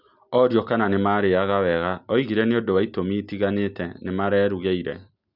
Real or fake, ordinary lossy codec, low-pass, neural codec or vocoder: real; none; 5.4 kHz; none